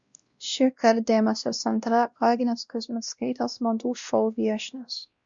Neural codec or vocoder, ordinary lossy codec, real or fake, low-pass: codec, 16 kHz, 1 kbps, X-Codec, WavLM features, trained on Multilingual LibriSpeech; Opus, 64 kbps; fake; 7.2 kHz